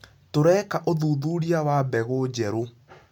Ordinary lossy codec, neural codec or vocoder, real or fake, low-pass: MP3, 96 kbps; none; real; 19.8 kHz